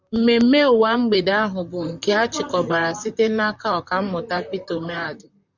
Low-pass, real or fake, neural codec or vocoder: 7.2 kHz; fake; vocoder, 44.1 kHz, 128 mel bands, Pupu-Vocoder